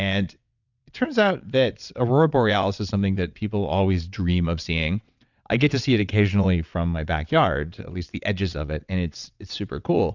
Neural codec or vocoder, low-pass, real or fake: vocoder, 22.05 kHz, 80 mel bands, WaveNeXt; 7.2 kHz; fake